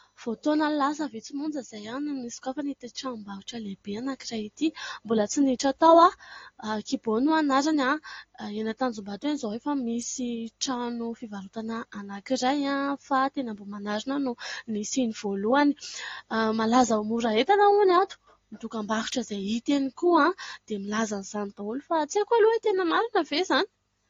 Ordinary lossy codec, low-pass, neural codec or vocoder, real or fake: AAC, 32 kbps; 7.2 kHz; none; real